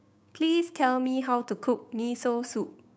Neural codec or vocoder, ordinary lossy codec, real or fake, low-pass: codec, 16 kHz, 6 kbps, DAC; none; fake; none